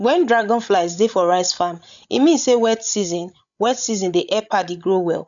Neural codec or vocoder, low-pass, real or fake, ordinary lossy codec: codec, 16 kHz, 16 kbps, FreqCodec, larger model; 7.2 kHz; fake; none